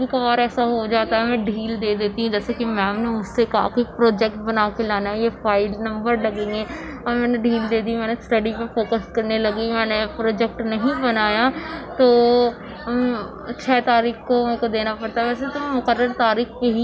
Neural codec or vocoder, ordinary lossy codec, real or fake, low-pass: none; none; real; none